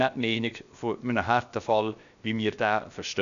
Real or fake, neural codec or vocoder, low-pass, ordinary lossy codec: fake; codec, 16 kHz, 0.7 kbps, FocalCodec; 7.2 kHz; none